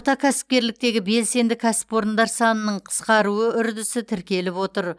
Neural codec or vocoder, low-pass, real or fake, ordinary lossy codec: none; none; real; none